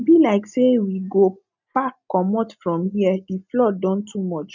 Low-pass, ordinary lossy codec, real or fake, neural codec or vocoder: 7.2 kHz; none; real; none